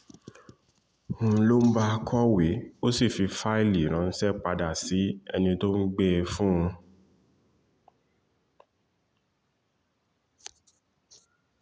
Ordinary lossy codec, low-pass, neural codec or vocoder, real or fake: none; none; none; real